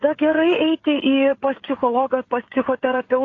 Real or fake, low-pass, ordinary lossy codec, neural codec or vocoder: fake; 7.2 kHz; AAC, 32 kbps; codec, 16 kHz, 16 kbps, FreqCodec, smaller model